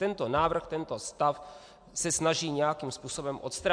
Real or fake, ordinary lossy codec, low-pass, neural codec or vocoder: real; AAC, 64 kbps; 9.9 kHz; none